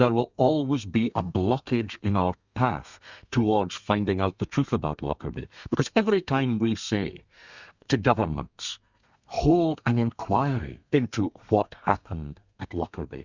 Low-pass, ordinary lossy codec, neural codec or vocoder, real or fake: 7.2 kHz; Opus, 64 kbps; codec, 32 kHz, 1.9 kbps, SNAC; fake